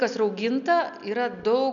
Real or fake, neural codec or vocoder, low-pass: real; none; 7.2 kHz